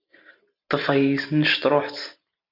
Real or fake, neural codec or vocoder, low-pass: real; none; 5.4 kHz